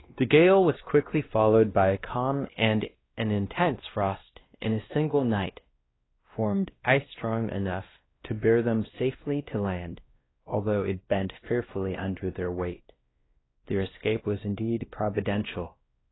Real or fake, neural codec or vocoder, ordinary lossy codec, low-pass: fake; codec, 16 kHz, 1 kbps, X-Codec, WavLM features, trained on Multilingual LibriSpeech; AAC, 16 kbps; 7.2 kHz